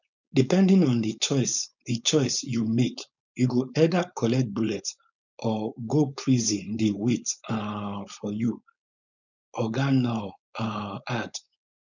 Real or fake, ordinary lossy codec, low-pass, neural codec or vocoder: fake; none; 7.2 kHz; codec, 16 kHz, 4.8 kbps, FACodec